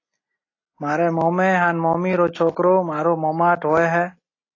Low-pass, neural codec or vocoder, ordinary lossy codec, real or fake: 7.2 kHz; none; AAC, 32 kbps; real